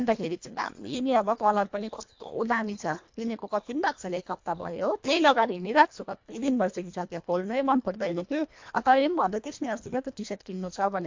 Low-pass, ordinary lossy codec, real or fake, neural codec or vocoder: 7.2 kHz; MP3, 48 kbps; fake; codec, 24 kHz, 1.5 kbps, HILCodec